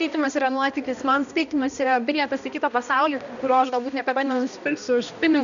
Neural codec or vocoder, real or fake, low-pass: codec, 16 kHz, 1 kbps, X-Codec, HuBERT features, trained on balanced general audio; fake; 7.2 kHz